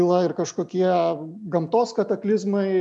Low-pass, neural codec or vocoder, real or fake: 10.8 kHz; none; real